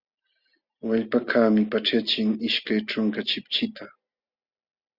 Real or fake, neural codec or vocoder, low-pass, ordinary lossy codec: real; none; 5.4 kHz; Opus, 64 kbps